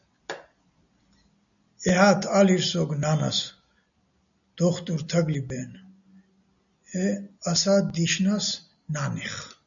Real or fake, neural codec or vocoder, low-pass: real; none; 7.2 kHz